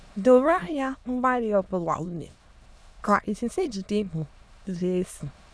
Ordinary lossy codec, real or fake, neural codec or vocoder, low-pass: none; fake; autoencoder, 22.05 kHz, a latent of 192 numbers a frame, VITS, trained on many speakers; none